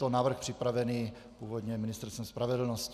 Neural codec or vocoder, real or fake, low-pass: none; real; 14.4 kHz